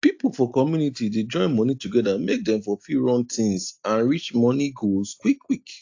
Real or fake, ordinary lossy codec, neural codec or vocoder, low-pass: real; AAC, 48 kbps; none; 7.2 kHz